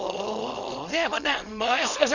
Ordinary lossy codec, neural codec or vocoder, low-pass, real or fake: none; codec, 24 kHz, 0.9 kbps, WavTokenizer, small release; 7.2 kHz; fake